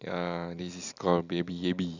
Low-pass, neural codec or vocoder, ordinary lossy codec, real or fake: 7.2 kHz; none; none; real